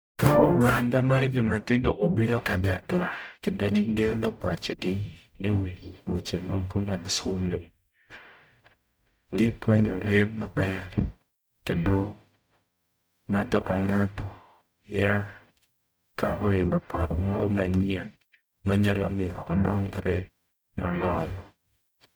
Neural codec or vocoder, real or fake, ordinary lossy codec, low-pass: codec, 44.1 kHz, 0.9 kbps, DAC; fake; none; none